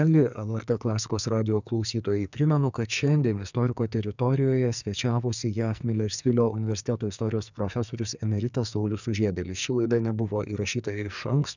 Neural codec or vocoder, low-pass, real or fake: codec, 44.1 kHz, 2.6 kbps, SNAC; 7.2 kHz; fake